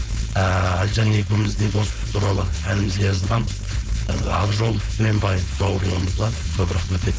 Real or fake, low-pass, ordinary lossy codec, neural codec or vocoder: fake; none; none; codec, 16 kHz, 4.8 kbps, FACodec